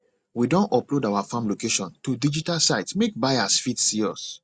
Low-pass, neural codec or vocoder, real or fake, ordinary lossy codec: 9.9 kHz; none; real; Opus, 64 kbps